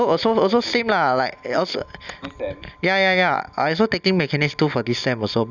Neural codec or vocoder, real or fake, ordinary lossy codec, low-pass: none; real; none; 7.2 kHz